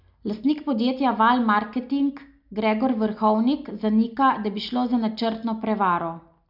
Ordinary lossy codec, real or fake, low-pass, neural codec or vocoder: none; real; 5.4 kHz; none